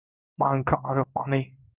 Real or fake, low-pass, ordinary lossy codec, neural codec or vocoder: fake; 3.6 kHz; Opus, 24 kbps; codec, 16 kHz in and 24 kHz out, 1 kbps, XY-Tokenizer